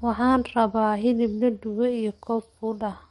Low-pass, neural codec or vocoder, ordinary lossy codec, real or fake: 19.8 kHz; codec, 44.1 kHz, 7.8 kbps, DAC; MP3, 48 kbps; fake